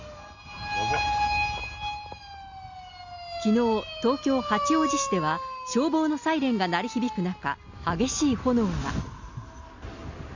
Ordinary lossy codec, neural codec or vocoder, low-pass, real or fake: Opus, 64 kbps; none; 7.2 kHz; real